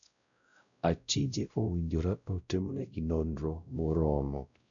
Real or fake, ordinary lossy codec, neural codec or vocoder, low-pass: fake; none; codec, 16 kHz, 0.5 kbps, X-Codec, WavLM features, trained on Multilingual LibriSpeech; 7.2 kHz